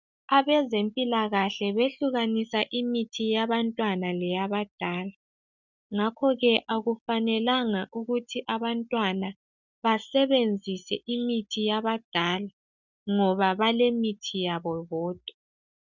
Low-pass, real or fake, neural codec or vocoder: 7.2 kHz; real; none